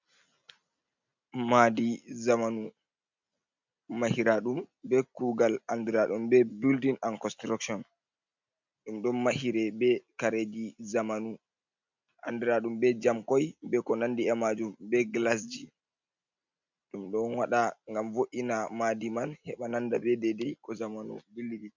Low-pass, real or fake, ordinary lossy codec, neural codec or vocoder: 7.2 kHz; real; MP3, 64 kbps; none